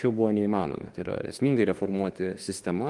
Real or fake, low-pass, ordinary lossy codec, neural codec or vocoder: fake; 10.8 kHz; Opus, 24 kbps; autoencoder, 48 kHz, 32 numbers a frame, DAC-VAE, trained on Japanese speech